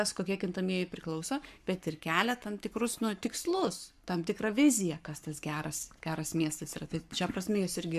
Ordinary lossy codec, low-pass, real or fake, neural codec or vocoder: AAC, 96 kbps; 14.4 kHz; fake; codec, 44.1 kHz, 7.8 kbps, Pupu-Codec